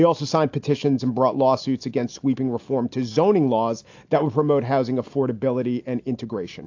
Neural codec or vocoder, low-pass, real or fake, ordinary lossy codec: none; 7.2 kHz; real; AAC, 48 kbps